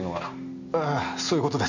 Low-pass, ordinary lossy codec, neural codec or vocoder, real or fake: 7.2 kHz; none; none; real